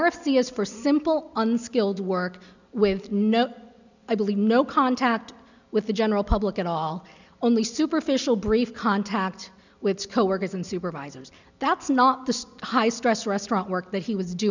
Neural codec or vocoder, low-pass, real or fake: none; 7.2 kHz; real